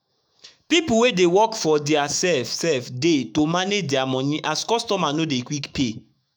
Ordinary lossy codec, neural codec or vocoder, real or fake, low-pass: none; autoencoder, 48 kHz, 128 numbers a frame, DAC-VAE, trained on Japanese speech; fake; none